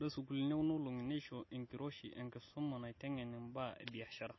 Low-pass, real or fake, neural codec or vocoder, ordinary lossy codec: 7.2 kHz; real; none; MP3, 24 kbps